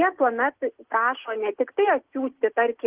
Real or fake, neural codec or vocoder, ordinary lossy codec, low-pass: real; none; Opus, 16 kbps; 3.6 kHz